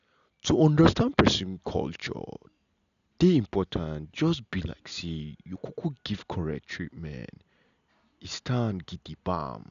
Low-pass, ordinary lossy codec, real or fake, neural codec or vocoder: 7.2 kHz; none; real; none